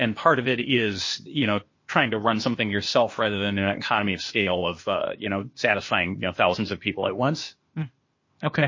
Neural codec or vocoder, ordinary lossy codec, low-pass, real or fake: codec, 16 kHz, 0.8 kbps, ZipCodec; MP3, 32 kbps; 7.2 kHz; fake